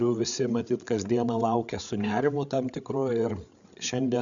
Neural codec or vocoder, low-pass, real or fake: codec, 16 kHz, 8 kbps, FreqCodec, larger model; 7.2 kHz; fake